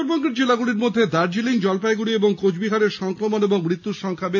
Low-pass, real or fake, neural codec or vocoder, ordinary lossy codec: 7.2 kHz; real; none; MP3, 48 kbps